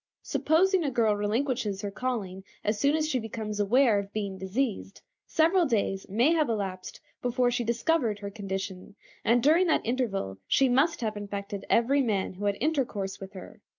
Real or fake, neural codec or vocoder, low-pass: real; none; 7.2 kHz